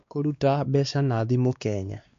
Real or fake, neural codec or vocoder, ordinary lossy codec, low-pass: fake; codec, 16 kHz, 2 kbps, X-Codec, WavLM features, trained on Multilingual LibriSpeech; MP3, 48 kbps; 7.2 kHz